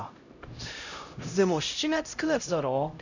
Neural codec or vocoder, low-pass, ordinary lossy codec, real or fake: codec, 16 kHz, 0.5 kbps, X-Codec, HuBERT features, trained on LibriSpeech; 7.2 kHz; none; fake